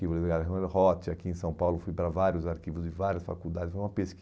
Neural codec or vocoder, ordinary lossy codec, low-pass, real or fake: none; none; none; real